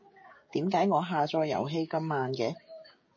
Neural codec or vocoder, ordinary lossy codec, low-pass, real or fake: codec, 16 kHz, 16 kbps, FreqCodec, smaller model; MP3, 32 kbps; 7.2 kHz; fake